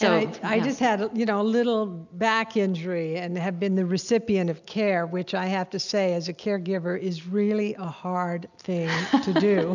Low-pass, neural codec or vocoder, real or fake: 7.2 kHz; none; real